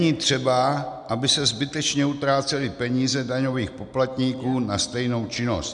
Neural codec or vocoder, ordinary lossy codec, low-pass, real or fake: none; MP3, 96 kbps; 10.8 kHz; real